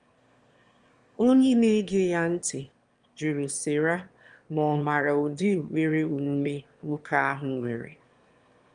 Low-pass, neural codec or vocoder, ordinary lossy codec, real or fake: 9.9 kHz; autoencoder, 22.05 kHz, a latent of 192 numbers a frame, VITS, trained on one speaker; Opus, 32 kbps; fake